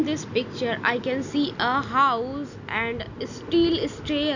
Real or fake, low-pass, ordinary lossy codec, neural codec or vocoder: real; 7.2 kHz; none; none